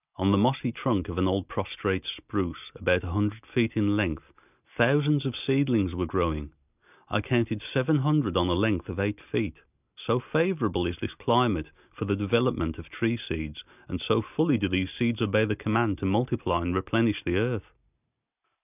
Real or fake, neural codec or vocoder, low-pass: real; none; 3.6 kHz